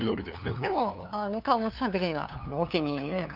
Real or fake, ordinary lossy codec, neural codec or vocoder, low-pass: fake; none; codec, 16 kHz, 2 kbps, FunCodec, trained on LibriTTS, 25 frames a second; 5.4 kHz